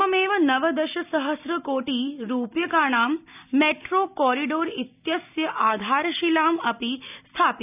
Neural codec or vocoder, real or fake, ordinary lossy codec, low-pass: none; real; none; 3.6 kHz